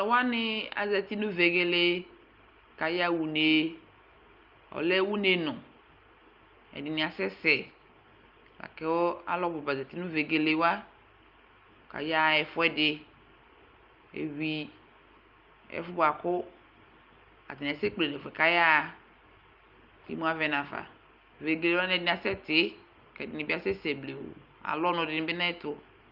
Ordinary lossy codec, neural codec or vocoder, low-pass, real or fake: Opus, 24 kbps; none; 5.4 kHz; real